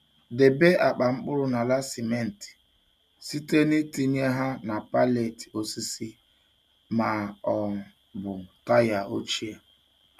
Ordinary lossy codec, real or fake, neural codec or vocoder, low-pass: none; real; none; 14.4 kHz